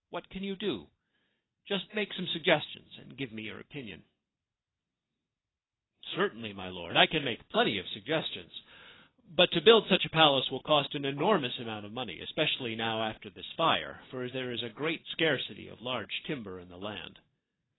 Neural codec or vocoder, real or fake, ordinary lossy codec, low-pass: none; real; AAC, 16 kbps; 7.2 kHz